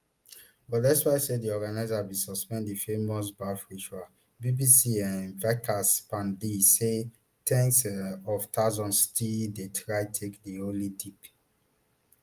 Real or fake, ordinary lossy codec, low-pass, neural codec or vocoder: real; Opus, 32 kbps; 14.4 kHz; none